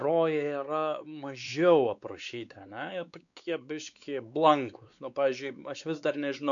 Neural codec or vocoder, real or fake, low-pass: codec, 16 kHz, 4 kbps, X-Codec, WavLM features, trained on Multilingual LibriSpeech; fake; 7.2 kHz